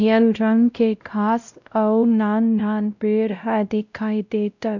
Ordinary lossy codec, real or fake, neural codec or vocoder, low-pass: none; fake; codec, 16 kHz, 0.5 kbps, X-Codec, WavLM features, trained on Multilingual LibriSpeech; 7.2 kHz